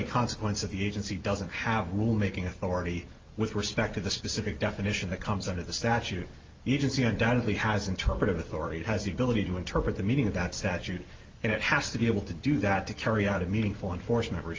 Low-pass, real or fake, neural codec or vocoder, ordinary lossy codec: 7.2 kHz; real; none; Opus, 32 kbps